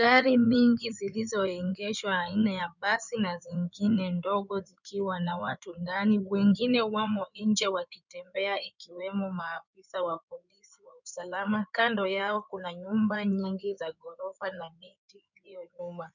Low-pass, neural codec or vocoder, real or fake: 7.2 kHz; codec, 16 kHz in and 24 kHz out, 2.2 kbps, FireRedTTS-2 codec; fake